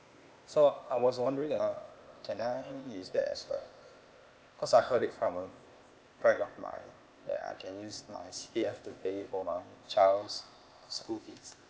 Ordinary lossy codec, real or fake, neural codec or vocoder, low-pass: none; fake; codec, 16 kHz, 0.8 kbps, ZipCodec; none